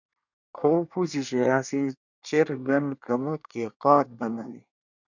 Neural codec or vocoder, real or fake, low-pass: codec, 24 kHz, 1 kbps, SNAC; fake; 7.2 kHz